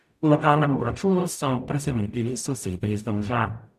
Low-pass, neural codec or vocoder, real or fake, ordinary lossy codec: 14.4 kHz; codec, 44.1 kHz, 0.9 kbps, DAC; fake; none